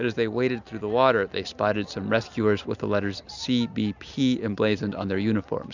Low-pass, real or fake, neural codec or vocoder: 7.2 kHz; real; none